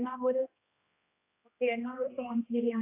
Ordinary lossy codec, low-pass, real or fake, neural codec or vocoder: AAC, 24 kbps; 3.6 kHz; fake; codec, 16 kHz, 1 kbps, X-Codec, HuBERT features, trained on general audio